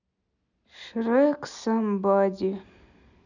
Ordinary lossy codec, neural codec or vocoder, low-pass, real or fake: none; none; 7.2 kHz; real